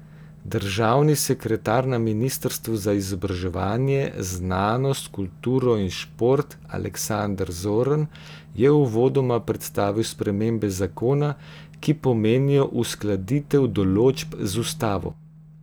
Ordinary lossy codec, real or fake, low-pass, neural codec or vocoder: none; real; none; none